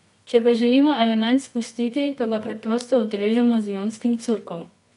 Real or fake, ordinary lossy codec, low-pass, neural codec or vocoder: fake; none; 10.8 kHz; codec, 24 kHz, 0.9 kbps, WavTokenizer, medium music audio release